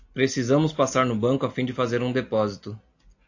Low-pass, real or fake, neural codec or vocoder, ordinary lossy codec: 7.2 kHz; real; none; AAC, 48 kbps